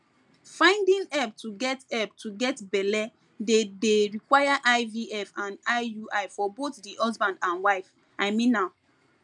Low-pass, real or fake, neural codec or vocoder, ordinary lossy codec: 10.8 kHz; real; none; none